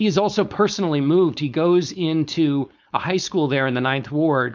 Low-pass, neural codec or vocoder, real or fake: 7.2 kHz; codec, 16 kHz, 4.8 kbps, FACodec; fake